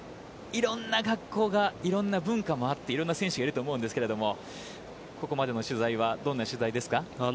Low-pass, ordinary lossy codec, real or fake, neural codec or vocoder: none; none; real; none